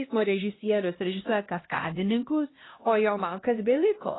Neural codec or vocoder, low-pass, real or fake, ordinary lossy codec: codec, 16 kHz, 1 kbps, X-Codec, HuBERT features, trained on LibriSpeech; 7.2 kHz; fake; AAC, 16 kbps